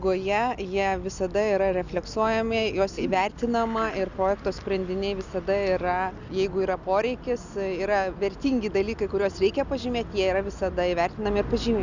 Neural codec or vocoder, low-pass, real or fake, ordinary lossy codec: none; 7.2 kHz; real; Opus, 64 kbps